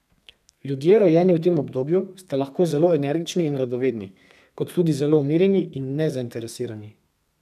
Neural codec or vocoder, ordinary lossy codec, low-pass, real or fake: codec, 32 kHz, 1.9 kbps, SNAC; none; 14.4 kHz; fake